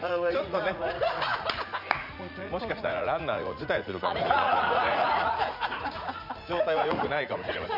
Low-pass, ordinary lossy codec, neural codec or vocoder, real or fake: 5.4 kHz; none; vocoder, 44.1 kHz, 80 mel bands, Vocos; fake